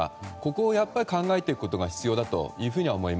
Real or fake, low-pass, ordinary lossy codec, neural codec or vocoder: real; none; none; none